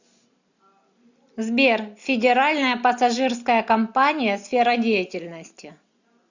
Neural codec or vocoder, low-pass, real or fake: none; 7.2 kHz; real